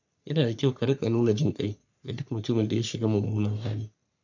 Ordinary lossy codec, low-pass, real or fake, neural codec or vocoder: none; 7.2 kHz; fake; codec, 44.1 kHz, 3.4 kbps, Pupu-Codec